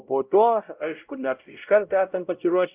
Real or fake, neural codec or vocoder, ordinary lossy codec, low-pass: fake; codec, 16 kHz, 0.5 kbps, X-Codec, WavLM features, trained on Multilingual LibriSpeech; Opus, 24 kbps; 3.6 kHz